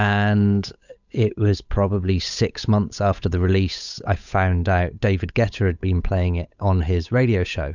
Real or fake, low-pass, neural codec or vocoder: fake; 7.2 kHz; codec, 16 kHz, 8 kbps, FunCodec, trained on Chinese and English, 25 frames a second